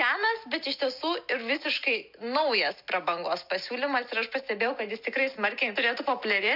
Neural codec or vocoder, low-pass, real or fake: none; 5.4 kHz; real